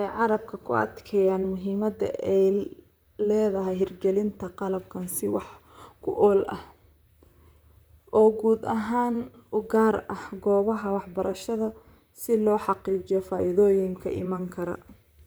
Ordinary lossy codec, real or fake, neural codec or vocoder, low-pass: none; fake; vocoder, 44.1 kHz, 128 mel bands, Pupu-Vocoder; none